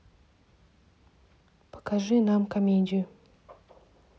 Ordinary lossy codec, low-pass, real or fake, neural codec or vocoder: none; none; real; none